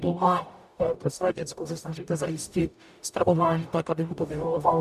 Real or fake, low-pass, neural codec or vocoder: fake; 14.4 kHz; codec, 44.1 kHz, 0.9 kbps, DAC